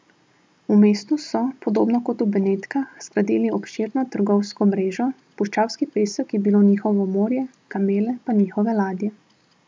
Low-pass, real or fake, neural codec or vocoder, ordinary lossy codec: none; real; none; none